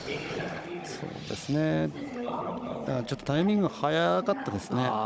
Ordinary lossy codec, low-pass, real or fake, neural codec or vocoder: none; none; fake; codec, 16 kHz, 16 kbps, FunCodec, trained on Chinese and English, 50 frames a second